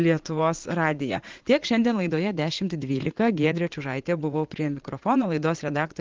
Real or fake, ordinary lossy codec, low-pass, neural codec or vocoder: fake; Opus, 16 kbps; 7.2 kHz; vocoder, 44.1 kHz, 80 mel bands, Vocos